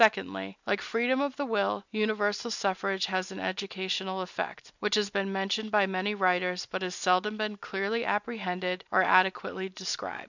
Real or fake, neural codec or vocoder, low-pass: real; none; 7.2 kHz